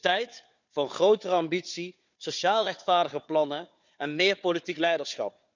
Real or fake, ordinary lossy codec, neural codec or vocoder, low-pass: fake; none; codec, 16 kHz, 4 kbps, FunCodec, trained on Chinese and English, 50 frames a second; 7.2 kHz